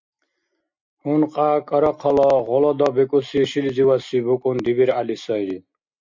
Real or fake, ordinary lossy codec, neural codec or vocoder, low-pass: real; MP3, 64 kbps; none; 7.2 kHz